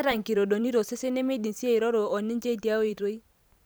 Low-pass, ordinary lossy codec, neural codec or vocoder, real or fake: none; none; vocoder, 44.1 kHz, 128 mel bands every 512 samples, BigVGAN v2; fake